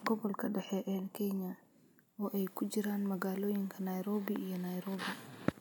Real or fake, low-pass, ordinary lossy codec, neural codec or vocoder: real; none; none; none